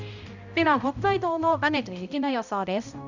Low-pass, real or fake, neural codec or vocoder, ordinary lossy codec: 7.2 kHz; fake; codec, 16 kHz, 0.5 kbps, X-Codec, HuBERT features, trained on balanced general audio; none